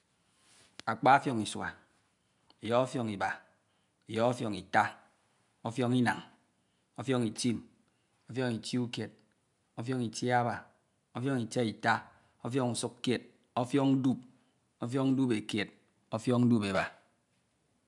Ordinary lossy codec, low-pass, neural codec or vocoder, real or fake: none; 10.8 kHz; none; real